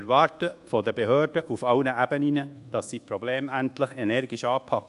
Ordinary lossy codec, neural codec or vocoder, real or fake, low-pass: AAC, 64 kbps; codec, 24 kHz, 1.2 kbps, DualCodec; fake; 10.8 kHz